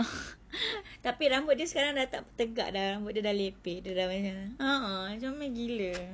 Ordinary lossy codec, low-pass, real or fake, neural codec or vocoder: none; none; real; none